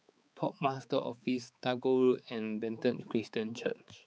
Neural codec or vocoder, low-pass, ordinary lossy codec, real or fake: codec, 16 kHz, 4 kbps, X-Codec, HuBERT features, trained on balanced general audio; none; none; fake